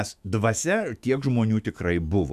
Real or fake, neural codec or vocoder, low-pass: fake; autoencoder, 48 kHz, 128 numbers a frame, DAC-VAE, trained on Japanese speech; 14.4 kHz